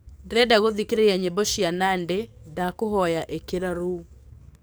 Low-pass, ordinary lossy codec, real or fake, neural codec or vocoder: none; none; fake; codec, 44.1 kHz, 7.8 kbps, DAC